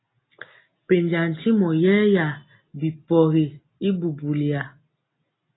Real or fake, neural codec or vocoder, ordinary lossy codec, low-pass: real; none; AAC, 16 kbps; 7.2 kHz